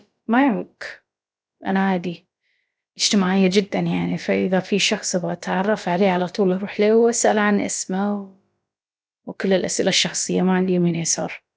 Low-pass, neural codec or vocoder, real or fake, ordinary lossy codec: none; codec, 16 kHz, about 1 kbps, DyCAST, with the encoder's durations; fake; none